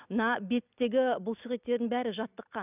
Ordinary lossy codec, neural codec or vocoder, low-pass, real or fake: none; none; 3.6 kHz; real